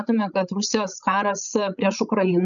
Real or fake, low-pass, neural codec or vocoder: fake; 7.2 kHz; codec, 16 kHz, 16 kbps, FreqCodec, larger model